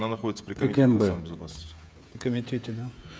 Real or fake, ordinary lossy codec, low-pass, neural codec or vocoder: fake; none; none; codec, 16 kHz, 16 kbps, FreqCodec, smaller model